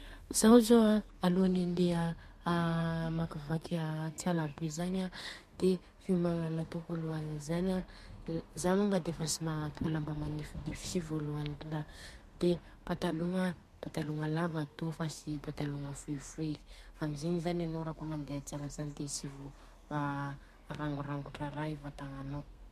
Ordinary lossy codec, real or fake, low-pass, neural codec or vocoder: MP3, 64 kbps; fake; 14.4 kHz; codec, 32 kHz, 1.9 kbps, SNAC